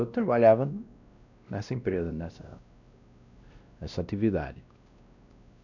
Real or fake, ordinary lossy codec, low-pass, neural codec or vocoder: fake; none; 7.2 kHz; codec, 16 kHz, 1 kbps, X-Codec, WavLM features, trained on Multilingual LibriSpeech